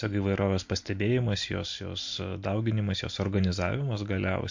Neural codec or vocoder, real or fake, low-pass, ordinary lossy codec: none; real; 7.2 kHz; MP3, 48 kbps